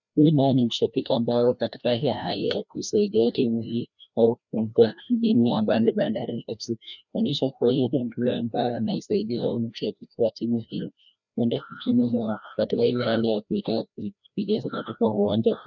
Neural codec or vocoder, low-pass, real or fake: codec, 16 kHz, 1 kbps, FreqCodec, larger model; 7.2 kHz; fake